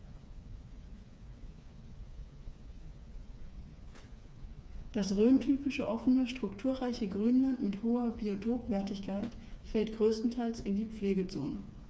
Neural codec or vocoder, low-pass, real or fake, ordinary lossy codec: codec, 16 kHz, 4 kbps, FreqCodec, smaller model; none; fake; none